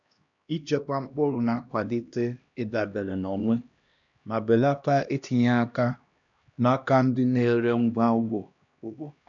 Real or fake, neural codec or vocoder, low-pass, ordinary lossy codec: fake; codec, 16 kHz, 1 kbps, X-Codec, HuBERT features, trained on LibriSpeech; 7.2 kHz; none